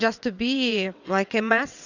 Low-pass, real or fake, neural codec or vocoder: 7.2 kHz; fake; vocoder, 22.05 kHz, 80 mel bands, WaveNeXt